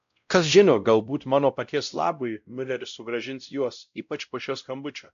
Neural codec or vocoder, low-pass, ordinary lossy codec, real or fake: codec, 16 kHz, 0.5 kbps, X-Codec, WavLM features, trained on Multilingual LibriSpeech; 7.2 kHz; AAC, 96 kbps; fake